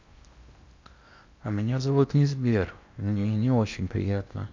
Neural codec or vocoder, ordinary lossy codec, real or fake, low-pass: codec, 16 kHz in and 24 kHz out, 0.8 kbps, FocalCodec, streaming, 65536 codes; MP3, 64 kbps; fake; 7.2 kHz